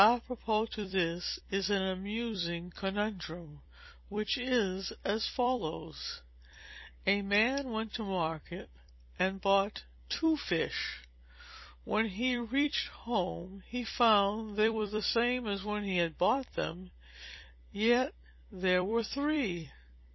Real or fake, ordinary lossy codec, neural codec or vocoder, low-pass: fake; MP3, 24 kbps; vocoder, 44.1 kHz, 128 mel bands every 256 samples, BigVGAN v2; 7.2 kHz